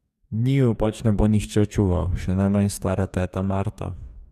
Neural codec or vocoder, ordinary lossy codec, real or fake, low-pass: codec, 44.1 kHz, 2.6 kbps, DAC; none; fake; 14.4 kHz